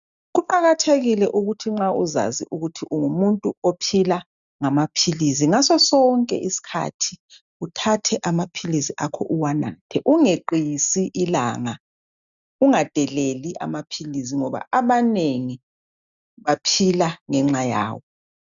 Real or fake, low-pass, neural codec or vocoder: real; 7.2 kHz; none